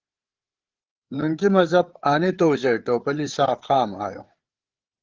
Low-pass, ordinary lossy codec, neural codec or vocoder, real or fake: 7.2 kHz; Opus, 16 kbps; codec, 16 kHz, 8 kbps, FreqCodec, larger model; fake